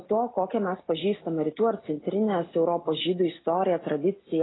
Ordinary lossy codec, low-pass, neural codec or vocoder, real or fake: AAC, 16 kbps; 7.2 kHz; none; real